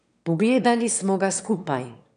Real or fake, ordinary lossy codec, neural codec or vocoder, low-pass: fake; none; autoencoder, 22.05 kHz, a latent of 192 numbers a frame, VITS, trained on one speaker; 9.9 kHz